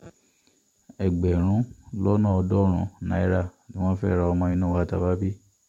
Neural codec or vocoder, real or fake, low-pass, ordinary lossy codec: vocoder, 44.1 kHz, 128 mel bands every 512 samples, BigVGAN v2; fake; 19.8 kHz; MP3, 64 kbps